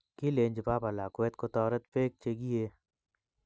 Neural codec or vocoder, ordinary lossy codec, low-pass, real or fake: none; none; none; real